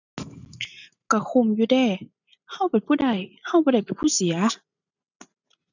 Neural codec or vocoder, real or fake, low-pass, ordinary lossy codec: none; real; 7.2 kHz; none